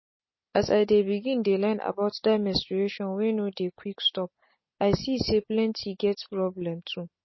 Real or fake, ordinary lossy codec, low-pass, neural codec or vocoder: real; MP3, 24 kbps; 7.2 kHz; none